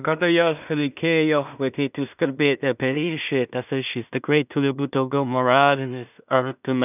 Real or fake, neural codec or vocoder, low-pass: fake; codec, 16 kHz in and 24 kHz out, 0.4 kbps, LongCat-Audio-Codec, two codebook decoder; 3.6 kHz